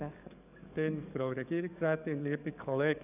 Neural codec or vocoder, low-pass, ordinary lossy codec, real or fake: codec, 44.1 kHz, 7.8 kbps, DAC; 3.6 kHz; none; fake